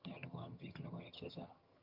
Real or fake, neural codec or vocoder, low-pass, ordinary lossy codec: fake; vocoder, 22.05 kHz, 80 mel bands, HiFi-GAN; 5.4 kHz; Opus, 24 kbps